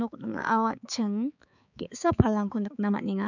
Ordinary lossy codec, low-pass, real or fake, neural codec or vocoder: none; 7.2 kHz; fake; codec, 16 kHz, 4 kbps, X-Codec, HuBERT features, trained on balanced general audio